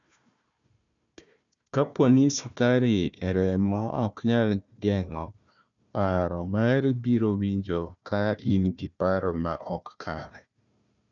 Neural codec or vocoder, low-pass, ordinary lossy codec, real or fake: codec, 16 kHz, 1 kbps, FunCodec, trained on Chinese and English, 50 frames a second; 7.2 kHz; none; fake